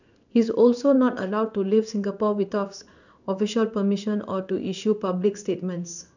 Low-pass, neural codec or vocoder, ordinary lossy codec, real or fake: 7.2 kHz; codec, 16 kHz in and 24 kHz out, 1 kbps, XY-Tokenizer; none; fake